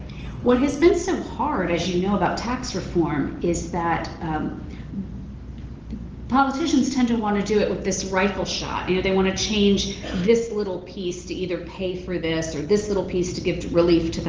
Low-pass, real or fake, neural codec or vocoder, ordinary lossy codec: 7.2 kHz; real; none; Opus, 16 kbps